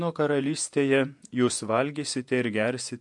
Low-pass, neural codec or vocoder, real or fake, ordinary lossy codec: 10.8 kHz; vocoder, 48 kHz, 128 mel bands, Vocos; fake; MP3, 64 kbps